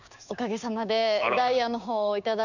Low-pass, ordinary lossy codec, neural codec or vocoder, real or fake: 7.2 kHz; none; codec, 16 kHz, 6 kbps, DAC; fake